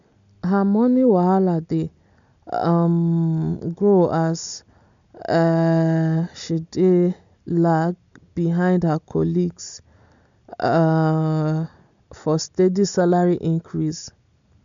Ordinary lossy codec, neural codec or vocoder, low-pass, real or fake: MP3, 64 kbps; none; 7.2 kHz; real